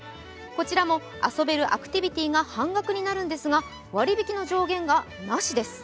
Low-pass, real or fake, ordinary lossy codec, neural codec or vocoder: none; real; none; none